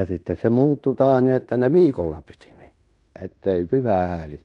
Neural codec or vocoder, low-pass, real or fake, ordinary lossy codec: codec, 16 kHz in and 24 kHz out, 0.9 kbps, LongCat-Audio-Codec, fine tuned four codebook decoder; 10.8 kHz; fake; none